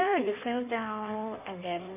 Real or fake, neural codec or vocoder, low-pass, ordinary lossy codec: fake; codec, 16 kHz in and 24 kHz out, 1.1 kbps, FireRedTTS-2 codec; 3.6 kHz; none